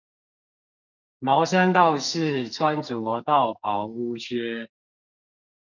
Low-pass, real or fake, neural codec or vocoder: 7.2 kHz; fake; codec, 44.1 kHz, 2.6 kbps, SNAC